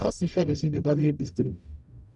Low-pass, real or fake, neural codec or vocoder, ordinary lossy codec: 10.8 kHz; fake; codec, 44.1 kHz, 0.9 kbps, DAC; none